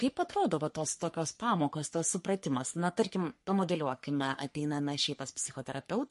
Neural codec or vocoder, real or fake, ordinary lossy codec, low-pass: codec, 44.1 kHz, 3.4 kbps, Pupu-Codec; fake; MP3, 48 kbps; 14.4 kHz